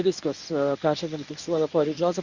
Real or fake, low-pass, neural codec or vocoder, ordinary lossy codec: fake; 7.2 kHz; codec, 24 kHz, 0.9 kbps, WavTokenizer, medium speech release version 1; Opus, 64 kbps